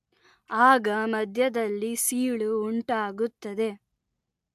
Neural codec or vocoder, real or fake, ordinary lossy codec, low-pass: none; real; AAC, 96 kbps; 14.4 kHz